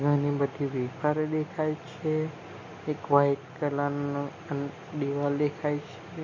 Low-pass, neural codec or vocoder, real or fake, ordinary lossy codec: 7.2 kHz; none; real; MP3, 32 kbps